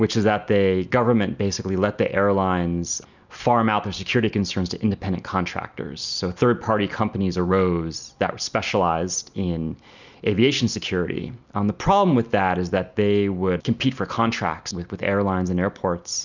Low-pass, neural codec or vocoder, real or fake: 7.2 kHz; none; real